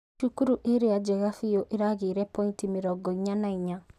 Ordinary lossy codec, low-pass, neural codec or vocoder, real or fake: none; 14.4 kHz; none; real